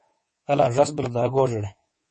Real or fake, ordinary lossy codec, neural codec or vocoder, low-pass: fake; MP3, 32 kbps; codec, 24 kHz, 3.1 kbps, DualCodec; 10.8 kHz